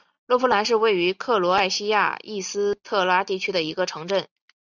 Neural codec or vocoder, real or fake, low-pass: none; real; 7.2 kHz